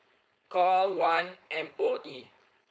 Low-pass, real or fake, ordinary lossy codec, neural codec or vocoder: none; fake; none; codec, 16 kHz, 4.8 kbps, FACodec